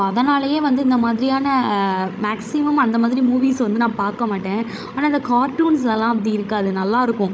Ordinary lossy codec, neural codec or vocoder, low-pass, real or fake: none; codec, 16 kHz, 16 kbps, FreqCodec, larger model; none; fake